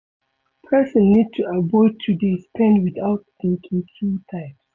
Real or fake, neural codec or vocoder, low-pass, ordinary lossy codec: real; none; 7.2 kHz; none